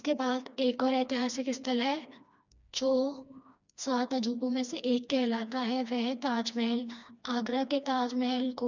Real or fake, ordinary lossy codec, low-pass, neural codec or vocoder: fake; none; 7.2 kHz; codec, 16 kHz, 2 kbps, FreqCodec, smaller model